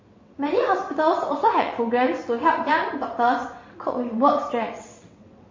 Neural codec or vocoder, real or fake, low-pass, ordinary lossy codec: vocoder, 22.05 kHz, 80 mel bands, WaveNeXt; fake; 7.2 kHz; MP3, 32 kbps